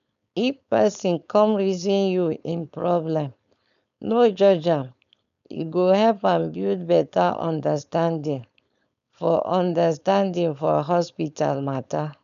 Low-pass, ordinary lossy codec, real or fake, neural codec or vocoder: 7.2 kHz; none; fake; codec, 16 kHz, 4.8 kbps, FACodec